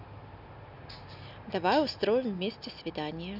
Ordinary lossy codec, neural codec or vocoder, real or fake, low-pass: none; none; real; 5.4 kHz